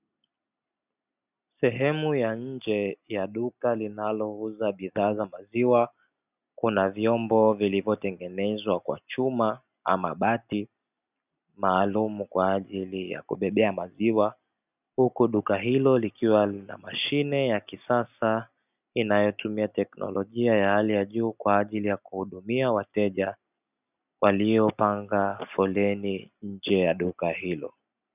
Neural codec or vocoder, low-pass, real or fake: none; 3.6 kHz; real